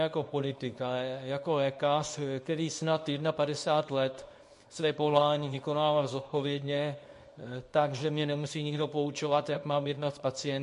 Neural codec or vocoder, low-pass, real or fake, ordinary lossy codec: codec, 24 kHz, 0.9 kbps, WavTokenizer, small release; 10.8 kHz; fake; MP3, 48 kbps